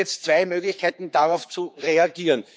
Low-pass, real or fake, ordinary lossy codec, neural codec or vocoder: none; fake; none; codec, 16 kHz, 4 kbps, X-Codec, HuBERT features, trained on general audio